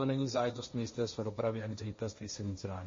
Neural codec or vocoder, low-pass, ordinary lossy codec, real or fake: codec, 16 kHz, 1.1 kbps, Voila-Tokenizer; 7.2 kHz; MP3, 32 kbps; fake